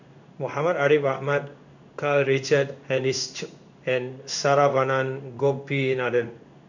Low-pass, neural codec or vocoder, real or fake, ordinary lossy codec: 7.2 kHz; codec, 16 kHz in and 24 kHz out, 1 kbps, XY-Tokenizer; fake; none